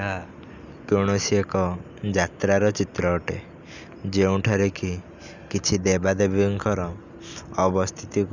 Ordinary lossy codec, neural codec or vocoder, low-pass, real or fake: none; none; 7.2 kHz; real